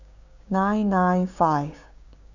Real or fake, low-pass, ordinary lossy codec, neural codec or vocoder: fake; 7.2 kHz; none; codec, 16 kHz, 6 kbps, DAC